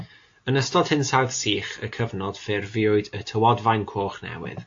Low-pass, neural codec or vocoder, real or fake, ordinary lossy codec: 7.2 kHz; none; real; AAC, 48 kbps